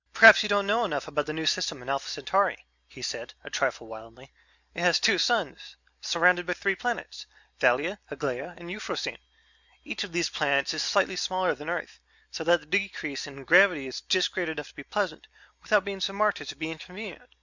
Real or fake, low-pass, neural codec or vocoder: real; 7.2 kHz; none